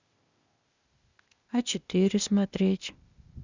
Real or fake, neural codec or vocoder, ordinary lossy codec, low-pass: fake; codec, 16 kHz, 0.8 kbps, ZipCodec; Opus, 64 kbps; 7.2 kHz